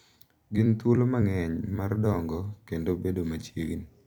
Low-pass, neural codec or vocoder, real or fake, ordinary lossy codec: 19.8 kHz; vocoder, 44.1 kHz, 128 mel bands every 256 samples, BigVGAN v2; fake; none